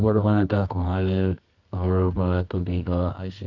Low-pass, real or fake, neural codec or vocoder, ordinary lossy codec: 7.2 kHz; fake; codec, 24 kHz, 0.9 kbps, WavTokenizer, medium music audio release; none